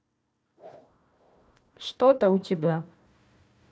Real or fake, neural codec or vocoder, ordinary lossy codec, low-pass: fake; codec, 16 kHz, 1 kbps, FunCodec, trained on Chinese and English, 50 frames a second; none; none